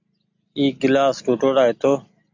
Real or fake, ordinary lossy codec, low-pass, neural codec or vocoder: real; AAC, 48 kbps; 7.2 kHz; none